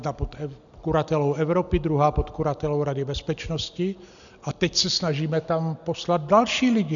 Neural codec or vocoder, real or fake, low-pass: none; real; 7.2 kHz